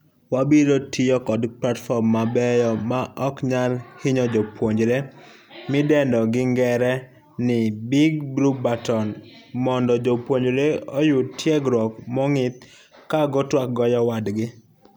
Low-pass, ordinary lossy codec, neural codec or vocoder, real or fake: none; none; none; real